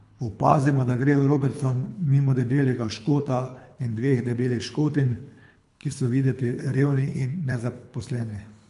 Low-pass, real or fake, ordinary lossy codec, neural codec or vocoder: 10.8 kHz; fake; none; codec, 24 kHz, 3 kbps, HILCodec